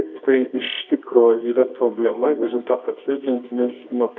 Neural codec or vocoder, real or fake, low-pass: codec, 24 kHz, 0.9 kbps, WavTokenizer, medium music audio release; fake; 7.2 kHz